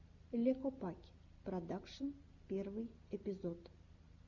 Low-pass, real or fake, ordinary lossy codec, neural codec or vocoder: 7.2 kHz; real; Opus, 64 kbps; none